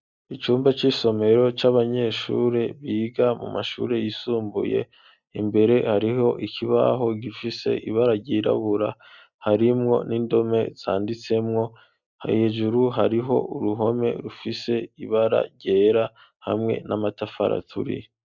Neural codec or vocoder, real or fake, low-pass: none; real; 7.2 kHz